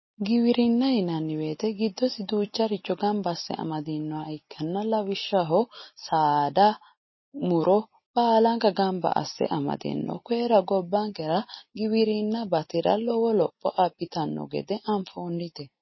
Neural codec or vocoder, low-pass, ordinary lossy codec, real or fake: none; 7.2 kHz; MP3, 24 kbps; real